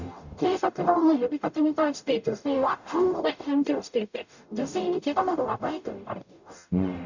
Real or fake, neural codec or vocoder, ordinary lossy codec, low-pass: fake; codec, 44.1 kHz, 0.9 kbps, DAC; none; 7.2 kHz